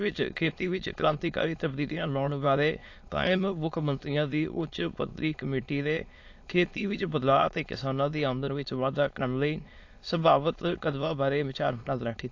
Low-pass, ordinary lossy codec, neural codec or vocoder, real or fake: 7.2 kHz; AAC, 48 kbps; autoencoder, 22.05 kHz, a latent of 192 numbers a frame, VITS, trained on many speakers; fake